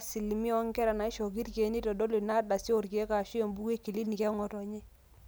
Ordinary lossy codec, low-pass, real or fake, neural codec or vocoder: none; none; real; none